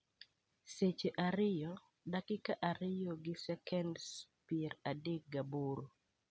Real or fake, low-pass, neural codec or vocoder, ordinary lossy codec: real; none; none; none